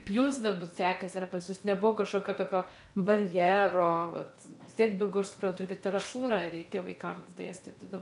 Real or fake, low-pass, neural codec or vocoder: fake; 10.8 kHz; codec, 16 kHz in and 24 kHz out, 0.8 kbps, FocalCodec, streaming, 65536 codes